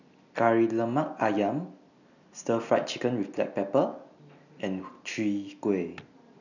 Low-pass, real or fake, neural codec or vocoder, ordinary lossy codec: 7.2 kHz; real; none; none